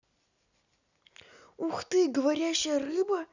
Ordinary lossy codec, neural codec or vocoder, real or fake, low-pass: none; none; real; 7.2 kHz